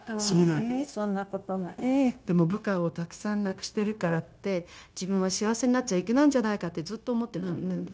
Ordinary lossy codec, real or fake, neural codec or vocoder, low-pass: none; fake; codec, 16 kHz, 0.9 kbps, LongCat-Audio-Codec; none